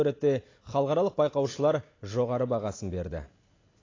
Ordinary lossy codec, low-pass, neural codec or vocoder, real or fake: AAC, 32 kbps; 7.2 kHz; none; real